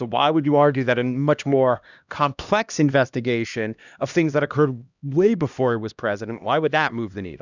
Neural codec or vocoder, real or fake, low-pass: codec, 16 kHz, 1 kbps, X-Codec, HuBERT features, trained on LibriSpeech; fake; 7.2 kHz